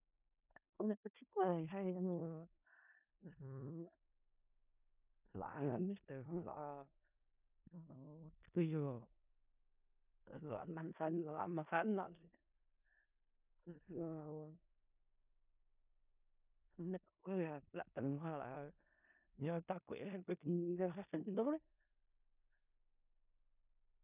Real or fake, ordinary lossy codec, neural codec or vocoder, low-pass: fake; none; codec, 16 kHz in and 24 kHz out, 0.4 kbps, LongCat-Audio-Codec, four codebook decoder; 3.6 kHz